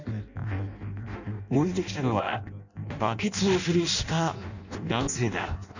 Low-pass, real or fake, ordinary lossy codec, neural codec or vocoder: 7.2 kHz; fake; none; codec, 16 kHz in and 24 kHz out, 0.6 kbps, FireRedTTS-2 codec